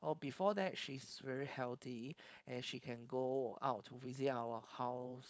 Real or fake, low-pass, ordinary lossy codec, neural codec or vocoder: fake; none; none; codec, 16 kHz, 4.8 kbps, FACodec